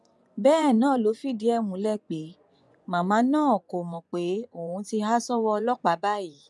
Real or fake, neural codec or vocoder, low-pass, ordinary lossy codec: fake; vocoder, 24 kHz, 100 mel bands, Vocos; none; none